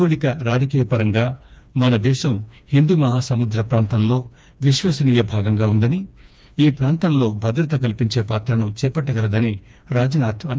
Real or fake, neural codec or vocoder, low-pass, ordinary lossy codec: fake; codec, 16 kHz, 2 kbps, FreqCodec, smaller model; none; none